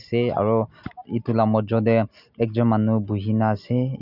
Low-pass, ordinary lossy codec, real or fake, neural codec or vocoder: 5.4 kHz; none; real; none